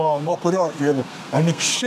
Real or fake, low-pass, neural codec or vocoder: fake; 14.4 kHz; codec, 32 kHz, 1.9 kbps, SNAC